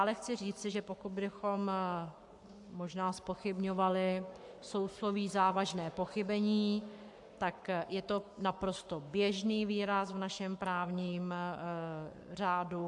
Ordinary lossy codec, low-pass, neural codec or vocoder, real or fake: AAC, 64 kbps; 10.8 kHz; codec, 44.1 kHz, 7.8 kbps, Pupu-Codec; fake